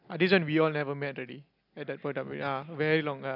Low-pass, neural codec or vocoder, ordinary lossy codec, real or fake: 5.4 kHz; none; none; real